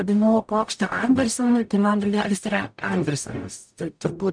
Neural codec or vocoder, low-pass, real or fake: codec, 44.1 kHz, 0.9 kbps, DAC; 9.9 kHz; fake